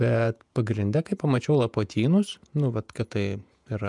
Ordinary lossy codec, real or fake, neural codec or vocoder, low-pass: MP3, 96 kbps; fake; vocoder, 48 kHz, 128 mel bands, Vocos; 10.8 kHz